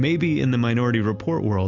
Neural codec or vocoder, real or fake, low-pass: none; real; 7.2 kHz